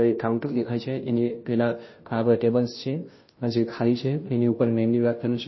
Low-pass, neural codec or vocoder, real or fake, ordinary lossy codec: 7.2 kHz; codec, 16 kHz, 0.5 kbps, FunCodec, trained on Chinese and English, 25 frames a second; fake; MP3, 24 kbps